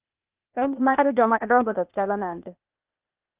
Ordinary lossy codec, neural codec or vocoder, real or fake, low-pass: Opus, 32 kbps; codec, 16 kHz, 0.8 kbps, ZipCodec; fake; 3.6 kHz